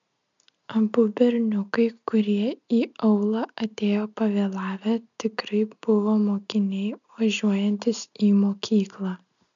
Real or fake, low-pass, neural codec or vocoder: real; 7.2 kHz; none